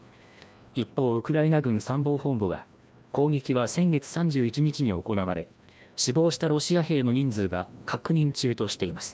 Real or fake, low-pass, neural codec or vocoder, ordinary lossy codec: fake; none; codec, 16 kHz, 1 kbps, FreqCodec, larger model; none